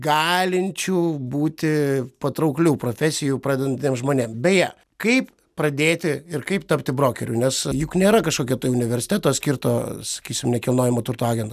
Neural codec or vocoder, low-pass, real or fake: none; 14.4 kHz; real